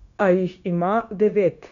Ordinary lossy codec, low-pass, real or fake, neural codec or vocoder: none; 7.2 kHz; fake; codec, 16 kHz, 0.9 kbps, LongCat-Audio-Codec